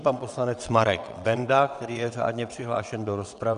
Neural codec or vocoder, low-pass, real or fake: vocoder, 22.05 kHz, 80 mel bands, WaveNeXt; 9.9 kHz; fake